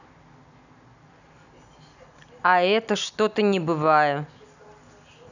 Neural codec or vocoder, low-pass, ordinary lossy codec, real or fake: none; 7.2 kHz; none; real